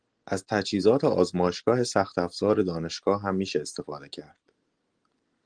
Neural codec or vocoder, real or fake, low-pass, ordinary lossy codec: none; real; 9.9 kHz; Opus, 24 kbps